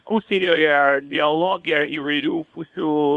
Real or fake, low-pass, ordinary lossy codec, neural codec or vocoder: fake; 10.8 kHz; Opus, 64 kbps; codec, 24 kHz, 0.9 kbps, WavTokenizer, small release